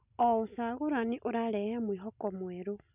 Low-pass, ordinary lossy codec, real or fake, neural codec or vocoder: 3.6 kHz; none; real; none